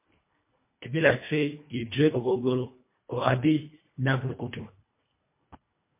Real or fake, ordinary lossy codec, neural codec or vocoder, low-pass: fake; MP3, 24 kbps; codec, 24 kHz, 1.5 kbps, HILCodec; 3.6 kHz